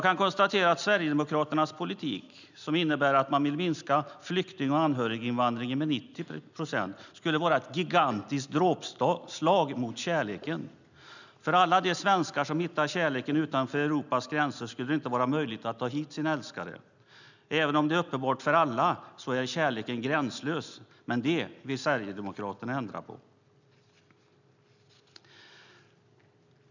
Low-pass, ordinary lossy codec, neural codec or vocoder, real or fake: 7.2 kHz; none; none; real